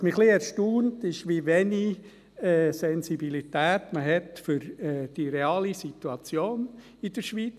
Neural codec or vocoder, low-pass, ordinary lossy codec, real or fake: none; 14.4 kHz; none; real